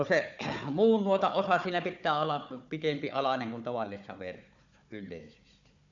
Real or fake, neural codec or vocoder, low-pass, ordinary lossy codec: fake; codec, 16 kHz, 4 kbps, FunCodec, trained on Chinese and English, 50 frames a second; 7.2 kHz; none